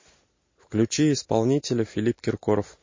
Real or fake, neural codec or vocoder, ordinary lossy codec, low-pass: real; none; MP3, 32 kbps; 7.2 kHz